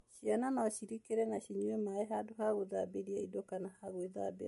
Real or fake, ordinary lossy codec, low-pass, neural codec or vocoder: real; MP3, 48 kbps; 14.4 kHz; none